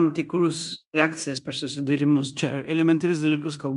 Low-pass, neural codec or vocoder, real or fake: 10.8 kHz; codec, 16 kHz in and 24 kHz out, 0.9 kbps, LongCat-Audio-Codec, fine tuned four codebook decoder; fake